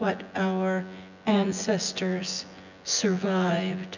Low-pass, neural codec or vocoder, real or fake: 7.2 kHz; vocoder, 24 kHz, 100 mel bands, Vocos; fake